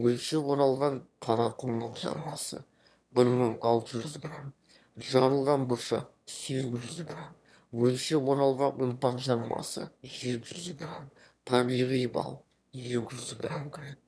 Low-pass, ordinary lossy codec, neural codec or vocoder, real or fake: none; none; autoencoder, 22.05 kHz, a latent of 192 numbers a frame, VITS, trained on one speaker; fake